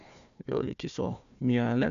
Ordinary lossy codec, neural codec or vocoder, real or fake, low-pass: MP3, 96 kbps; codec, 16 kHz, 1 kbps, FunCodec, trained on Chinese and English, 50 frames a second; fake; 7.2 kHz